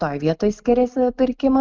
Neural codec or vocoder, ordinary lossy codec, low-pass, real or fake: none; Opus, 24 kbps; 7.2 kHz; real